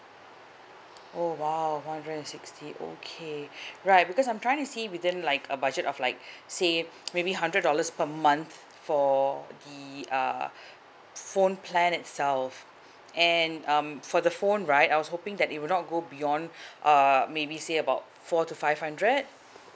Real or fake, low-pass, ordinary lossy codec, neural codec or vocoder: real; none; none; none